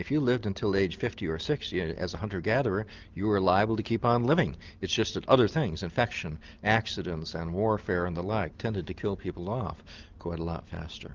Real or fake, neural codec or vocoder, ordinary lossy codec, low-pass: real; none; Opus, 32 kbps; 7.2 kHz